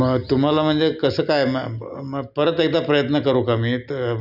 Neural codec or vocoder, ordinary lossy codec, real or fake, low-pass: none; none; real; 5.4 kHz